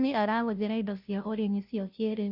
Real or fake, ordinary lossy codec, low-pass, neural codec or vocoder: fake; none; 5.4 kHz; codec, 16 kHz, 0.5 kbps, FunCodec, trained on Chinese and English, 25 frames a second